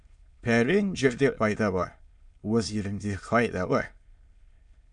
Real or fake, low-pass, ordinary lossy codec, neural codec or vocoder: fake; 9.9 kHz; MP3, 64 kbps; autoencoder, 22.05 kHz, a latent of 192 numbers a frame, VITS, trained on many speakers